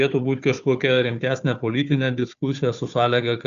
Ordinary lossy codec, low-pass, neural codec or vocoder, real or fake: Opus, 64 kbps; 7.2 kHz; codec, 16 kHz, 4 kbps, FunCodec, trained on Chinese and English, 50 frames a second; fake